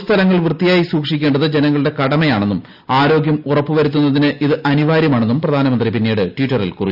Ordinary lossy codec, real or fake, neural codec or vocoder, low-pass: none; real; none; 5.4 kHz